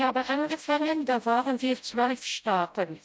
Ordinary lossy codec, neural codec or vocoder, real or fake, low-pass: none; codec, 16 kHz, 0.5 kbps, FreqCodec, smaller model; fake; none